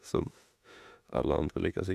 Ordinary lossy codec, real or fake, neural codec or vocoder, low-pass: none; fake; autoencoder, 48 kHz, 32 numbers a frame, DAC-VAE, trained on Japanese speech; 14.4 kHz